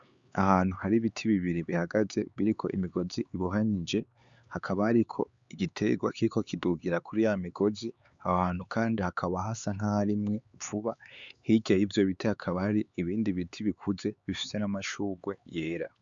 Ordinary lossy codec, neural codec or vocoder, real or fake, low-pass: Opus, 64 kbps; codec, 16 kHz, 4 kbps, X-Codec, HuBERT features, trained on LibriSpeech; fake; 7.2 kHz